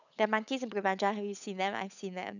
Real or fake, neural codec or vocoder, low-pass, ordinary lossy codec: fake; codec, 16 kHz, 8 kbps, FunCodec, trained on Chinese and English, 25 frames a second; 7.2 kHz; none